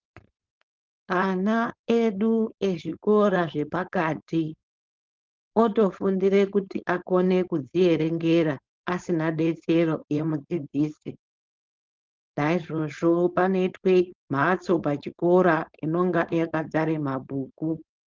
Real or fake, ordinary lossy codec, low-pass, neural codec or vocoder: fake; Opus, 32 kbps; 7.2 kHz; codec, 16 kHz, 4.8 kbps, FACodec